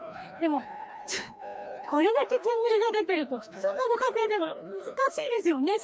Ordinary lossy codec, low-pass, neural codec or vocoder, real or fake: none; none; codec, 16 kHz, 1 kbps, FreqCodec, larger model; fake